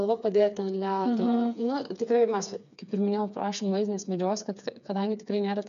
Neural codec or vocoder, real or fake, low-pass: codec, 16 kHz, 4 kbps, FreqCodec, smaller model; fake; 7.2 kHz